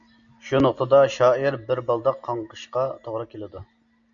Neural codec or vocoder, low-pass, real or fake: none; 7.2 kHz; real